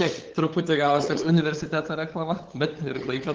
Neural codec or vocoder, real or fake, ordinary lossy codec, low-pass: codec, 16 kHz, 8 kbps, FunCodec, trained on LibriTTS, 25 frames a second; fake; Opus, 16 kbps; 7.2 kHz